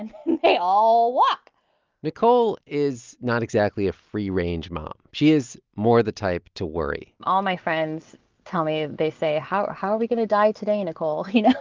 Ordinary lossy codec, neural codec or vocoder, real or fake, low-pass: Opus, 24 kbps; none; real; 7.2 kHz